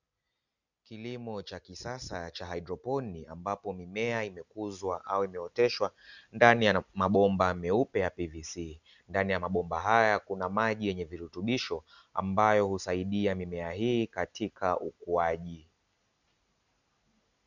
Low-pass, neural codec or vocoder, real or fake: 7.2 kHz; none; real